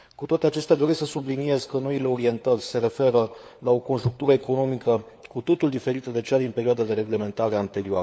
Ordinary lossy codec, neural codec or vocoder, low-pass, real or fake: none; codec, 16 kHz, 4 kbps, FunCodec, trained on LibriTTS, 50 frames a second; none; fake